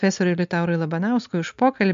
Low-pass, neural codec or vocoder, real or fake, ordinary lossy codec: 7.2 kHz; none; real; MP3, 48 kbps